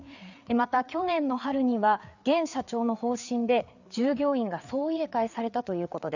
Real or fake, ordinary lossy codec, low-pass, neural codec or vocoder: fake; none; 7.2 kHz; codec, 16 kHz, 4 kbps, FreqCodec, larger model